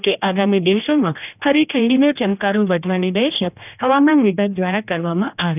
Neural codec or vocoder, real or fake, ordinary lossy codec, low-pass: codec, 16 kHz, 1 kbps, X-Codec, HuBERT features, trained on general audio; fake; none; 3.6 kHz